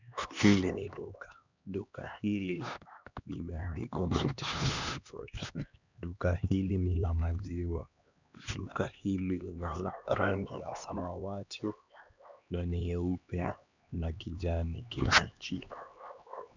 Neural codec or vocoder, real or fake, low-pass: codec, 16 kHz, 2 kbps, X-Codec, HuBERT features, trained on LibriSpeech; fake; 7.2 kHz